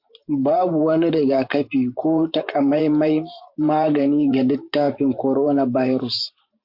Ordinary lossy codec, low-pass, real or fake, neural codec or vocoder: MP3, 48 kbps; 5.4 kHz; fake; vocoder, 44.1 kHz, 128 mel bands every 512 samples, BigVGAN v2